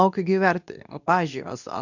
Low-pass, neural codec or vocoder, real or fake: 7.2 kHz; codec, 24 kHz, 0.9 kbps, WavTokenizer, medium speech release version 2; fake